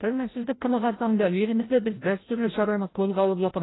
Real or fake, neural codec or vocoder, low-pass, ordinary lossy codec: fake; codec, 16 kHz, 0.5 kbps, FreqCodec, larger model; 7.2 kHz; AAC, 16 kbps